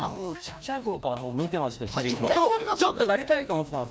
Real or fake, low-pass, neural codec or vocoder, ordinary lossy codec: fake; none; codec, 16 kHz, 1 kbps, FreqCodec, larger model; none